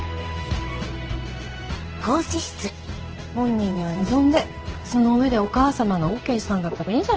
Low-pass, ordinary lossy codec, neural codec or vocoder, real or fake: 7.2 kHz; Opus, 16 kbps; codec, 16 kHz in and 24 kHz out, 1 kbps, XY-Tokenizer; fake